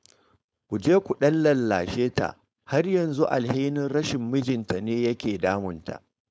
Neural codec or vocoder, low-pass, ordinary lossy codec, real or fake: codec, 16 kHz, 4.8 kbps, FACodec; none; none; fake